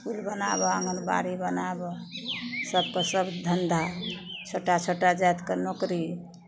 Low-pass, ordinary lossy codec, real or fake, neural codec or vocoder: none; none; real; none